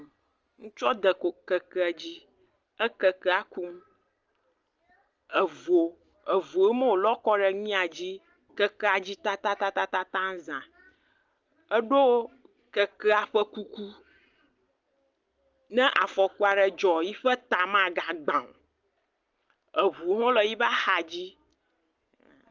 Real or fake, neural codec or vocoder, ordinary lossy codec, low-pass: real; none; Opus, 24 kbps; 7.2 kHz